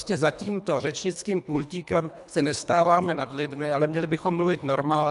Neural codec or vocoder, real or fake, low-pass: codec, 24 kHz, 1.5 kbps, HILCodec; fake; 10.8 kHz